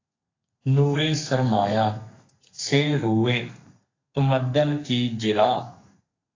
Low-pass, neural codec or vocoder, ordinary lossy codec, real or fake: 7.2 kHz; codec, 32 kHz, 1.9 kbps, SNAC; AAC, 32 kbps; fake